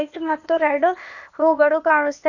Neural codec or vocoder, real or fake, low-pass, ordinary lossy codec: codec, 16 kHz, 0.8 kbps, ZipCodec; fake; 7.2 kHz; none